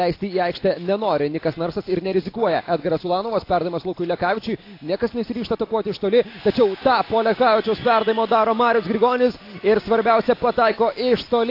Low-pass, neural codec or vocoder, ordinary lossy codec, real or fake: 5.4 kHz; none; AAC, 32 kbps; real